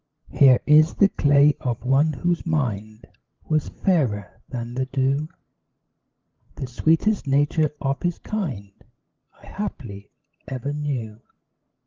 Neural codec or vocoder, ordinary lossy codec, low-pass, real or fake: codec, 16 kHz, 16 kbps, FreqCodec, larger model; Opus, 16 kbps; 7.2 kHz; fake